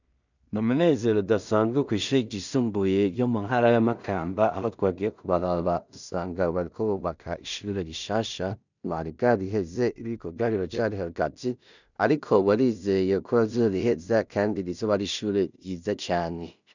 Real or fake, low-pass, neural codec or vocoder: fake; 7.2 kHz; codec, 16 kHz in and 24 kHz out, 0.4 kbps, LongCat-Audio-Codec, two codebook decoder